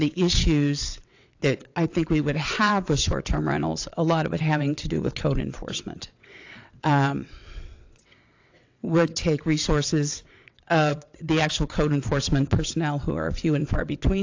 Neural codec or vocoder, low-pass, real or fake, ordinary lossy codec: vocoder, 22.05 kHz, 80 mel bands, WaveNeXt; 7.2 kHz; fake; AAC, 48 kbps